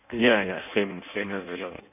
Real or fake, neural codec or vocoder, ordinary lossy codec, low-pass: fake; codec, 16 kHz in and 24 kHz out, 0.6 kbps, FireRedTTS-2 codec; none; 3.6 kHz